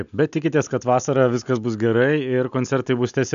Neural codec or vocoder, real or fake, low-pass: none; real; 7.2 kHz